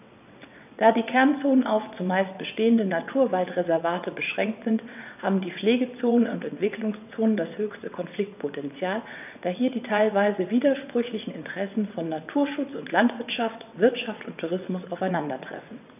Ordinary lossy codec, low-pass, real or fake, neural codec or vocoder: none; 3.6 kHz; fake; vocoder, 22.05 kHz, 80 mel bands, WaveNeXt